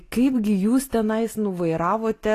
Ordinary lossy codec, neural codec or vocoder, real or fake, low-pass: AAC, 48 kbps; none; real; 14.4 kHz